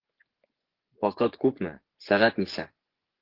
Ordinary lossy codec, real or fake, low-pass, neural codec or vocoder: Opus, 16 kbps; fake; 5.4 kHz; vocoder, 24 kHz, 100 mel bands, Vocos